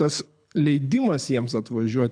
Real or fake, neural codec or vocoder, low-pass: fake; codec, 24 kHz, 6 kbps, HILCodec; 9.9 kHz